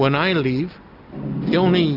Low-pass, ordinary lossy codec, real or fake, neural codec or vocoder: 5.4 kHz; AAC, 48 kbps; real; none